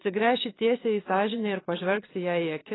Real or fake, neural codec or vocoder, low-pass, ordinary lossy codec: fake; vocoder, 22.05 kHz, 80 mel bands, Vocos; 7.2 kHz; AAC, 16 kbps